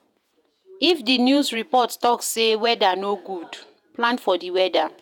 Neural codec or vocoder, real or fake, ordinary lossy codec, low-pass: vocoder, 48 kHz, 128 mel bands, Vocos; fake; none; 19.8 kHz